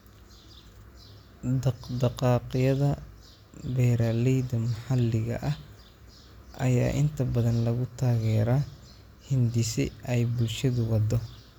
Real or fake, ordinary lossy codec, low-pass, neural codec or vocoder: real; none; 19.8 kHz; none